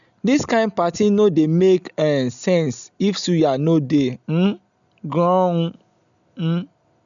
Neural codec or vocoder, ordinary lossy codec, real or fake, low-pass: none; none; real; 7.2 kHz